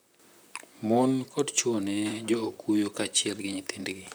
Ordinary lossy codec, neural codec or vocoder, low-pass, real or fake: none; vocoder, 44.1 kHz, 128 mel bands, Pupu-Vocoder; none; fake